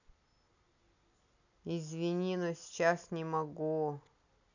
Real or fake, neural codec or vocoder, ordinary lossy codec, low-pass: real; none; none; 7.2 kHz